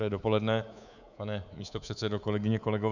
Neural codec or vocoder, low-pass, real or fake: codec, 24 kHz, 3.1 kbps, DualCodec; 7.2 kHz; fake